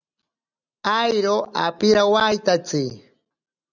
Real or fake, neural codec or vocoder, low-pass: real; none; 7.2 kHz